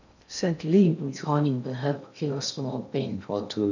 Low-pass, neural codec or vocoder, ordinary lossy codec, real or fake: 7.2 kHz; codec, 16 kHz in and 24 kHz out, 0.6 kbps, FocalCodec, streaming, 2048 codes; none; fake